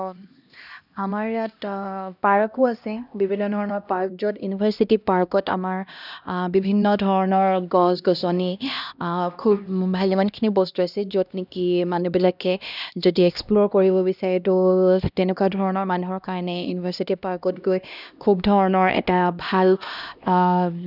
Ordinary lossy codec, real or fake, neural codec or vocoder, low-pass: none; fake; codec, 16 kHz, 1 kbps, X-Codec, HuBERT features, trained on LibriSpeech; 5.4 kHz